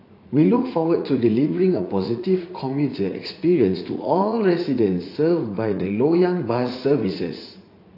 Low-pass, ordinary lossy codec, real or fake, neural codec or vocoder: 5.4 kHz; AAC, 32 kbps; fake; vocoder, 44.1 kHz, 80 mel bands, Vocos